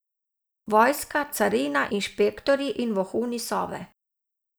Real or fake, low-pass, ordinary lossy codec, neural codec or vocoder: real; none; none; none